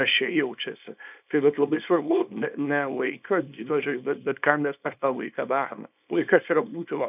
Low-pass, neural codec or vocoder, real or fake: 3.6 kHz; codec, 24 kHz, 0.9 kbps, WavTokenizer, small release; fake